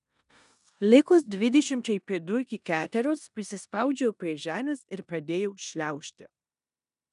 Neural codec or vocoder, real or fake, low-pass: codec, 16 kHz in and 24 kHz out, 0.9 kbps, LongCat-Audio-Codec, four codebook decoder; fake; 10.8 kHz